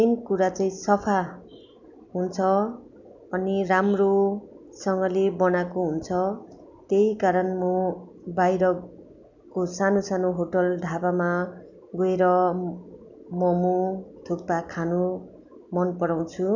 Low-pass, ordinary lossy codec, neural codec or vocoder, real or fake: 7.2 kHz; none; none; real